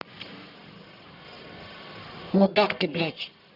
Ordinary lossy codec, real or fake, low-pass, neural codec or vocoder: none; fake; 5.4 kHz; codec, 44.1 kHz, 1.7 kbps, Pupu-Codec